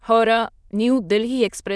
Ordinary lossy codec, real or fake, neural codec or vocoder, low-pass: none; fake; autoencoder, 22.05 kHz, a latent of 192 numbers a frame, VITS, trained on many speakers; none